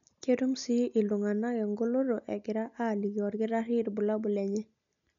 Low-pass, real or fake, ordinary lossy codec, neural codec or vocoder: 7.2 kHz; real; none; none